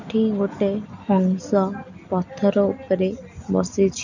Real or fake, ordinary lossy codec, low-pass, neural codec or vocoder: real; none; 7.2 kHz; none